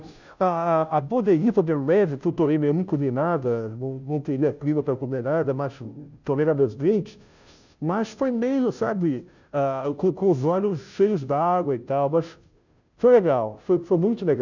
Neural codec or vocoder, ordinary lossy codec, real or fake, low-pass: codec, 16 kHz, 0.5 kbps, FunCodec, trained on Chinese and English, 25 frames a second; none; fake; 7.2 kHz